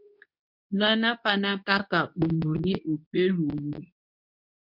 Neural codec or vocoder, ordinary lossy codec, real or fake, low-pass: codec, 24 kHz, 0.9 kbps, WavTokenizer, medium speech release version 2; MP3, 48 kbps; fake; 5.4 kHz